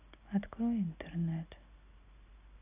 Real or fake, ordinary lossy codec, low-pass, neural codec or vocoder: real; AAC, 32 kbps; 3.6 kHz; none